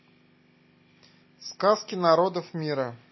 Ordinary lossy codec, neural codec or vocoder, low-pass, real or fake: MP3, 24 kbps; none; 7.2 kHz; real